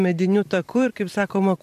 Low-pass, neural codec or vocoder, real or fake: 14.4 kHz; vocoder, 44.1 kHz, 128 mel bands every 256 samples, BigVGAN v2; fake